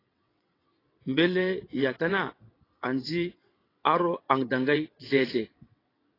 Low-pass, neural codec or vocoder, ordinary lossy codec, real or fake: 5.4 kHz; vocoder, 22.05 kHz, 80 mel bands, WaveNeXt; AAC, 24 kbps; fake